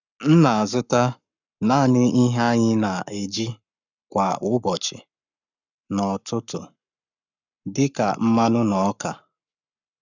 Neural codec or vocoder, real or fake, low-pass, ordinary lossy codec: codec, 44.1 kHz, 7.8 kbps, Pupu-Codec; fake; 7.2 kHz; none